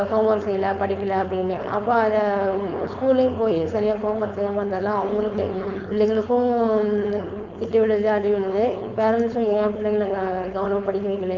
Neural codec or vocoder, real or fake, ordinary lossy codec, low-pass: codec, 16 kHz, 4.8 kbps, FACodec; fake; none; 7.2 kHz